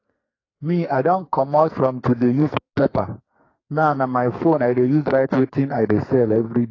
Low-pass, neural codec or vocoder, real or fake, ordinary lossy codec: 7.2 kHz; codec, 44.1 kHz, 2.6 kbps, SNAC; fake; AAC, 32 kbps